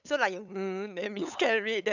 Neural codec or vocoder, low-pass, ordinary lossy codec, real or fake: codec, 16 kHz, 8 kbps, FunCodec, trained on LibriTTS, 25 frames a second; 7.2 kHz; none; fake